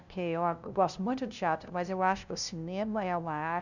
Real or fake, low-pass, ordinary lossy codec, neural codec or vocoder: fake; 7.2 kHz; none; codec, 16 kHz, 0.5 kbps, FunCodec, trained on LibriTTS, 25 frames a second